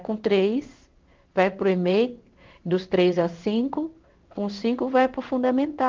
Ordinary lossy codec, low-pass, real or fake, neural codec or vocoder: Opus, 24 kbps; 7.2 kHz; fake; codec, 16 kHz in and 24 kHz out, 1 kbps, XY-Tokenizer